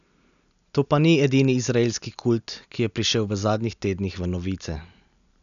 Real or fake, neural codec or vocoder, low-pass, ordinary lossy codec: real; none; 7.2 kHz; none